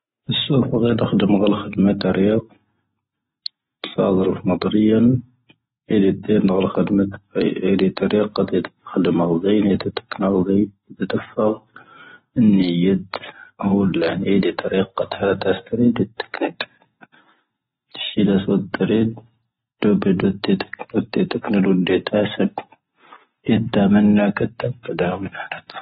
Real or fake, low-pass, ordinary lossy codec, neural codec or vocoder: real; 19.8 kHz; AAC, 16 kbps; none